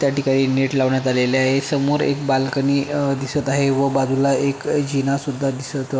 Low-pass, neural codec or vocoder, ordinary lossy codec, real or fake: none; none; none; real